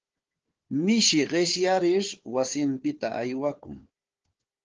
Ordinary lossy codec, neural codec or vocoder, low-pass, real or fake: Opus, 16 kbps; codec, 16 kHz, 4 kbps, FunCodec, trained on Chinese and English, 50 frames a second; 7.2 kHz; fake